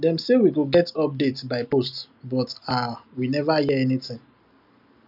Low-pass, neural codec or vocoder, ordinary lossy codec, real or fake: 5.4 kHz; none; none; real